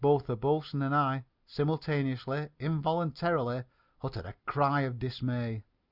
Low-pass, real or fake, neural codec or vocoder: 5.4 kHz; real; none